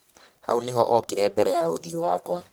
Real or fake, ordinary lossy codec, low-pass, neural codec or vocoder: fake; none; none; codec, 44.1 kHz, 1.7 kbps, Pupu-Codec